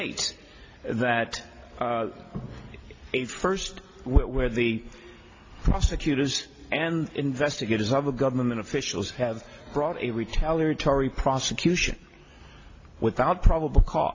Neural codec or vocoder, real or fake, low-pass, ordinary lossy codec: none; real; 7.2 kHz; AAC, 32 kbps